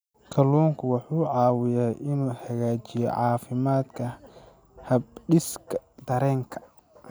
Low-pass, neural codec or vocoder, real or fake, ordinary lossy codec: none; none; real; none